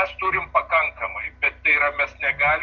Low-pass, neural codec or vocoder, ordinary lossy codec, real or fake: 7.2 kHz; none; Opus, 24 kbps; real